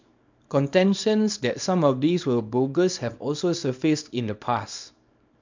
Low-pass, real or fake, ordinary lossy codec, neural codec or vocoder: 7.2 kHz; fake; MP3, 64 kbps; codec, 24 kHz, 0.9 kbps, WavTokenizer, small release